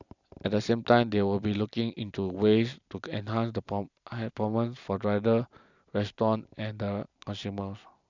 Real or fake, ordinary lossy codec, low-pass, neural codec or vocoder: real; none; 7.2 kHz; none